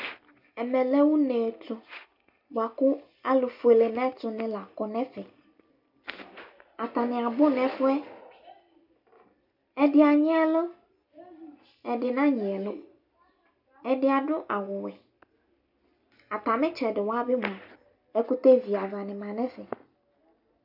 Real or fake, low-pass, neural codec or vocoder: real; 5.4 kHz; none